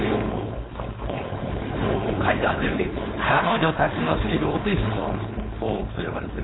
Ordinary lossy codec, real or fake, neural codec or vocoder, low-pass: AAC, 16 kbps; fake; codec, 16 kHz, 4.8 kbps, FACodec; 7.2 kHz